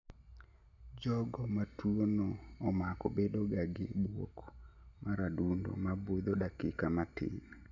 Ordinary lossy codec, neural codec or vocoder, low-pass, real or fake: none; none; 7.2 kHz; real